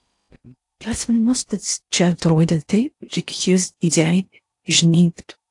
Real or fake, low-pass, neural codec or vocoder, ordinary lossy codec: fake; 10.8 kHz; codec, 16 kHz in and 24 kHz out, 0.6 kbps, FocalCodec, streaming, 4096 codes; AAC, 64 kbps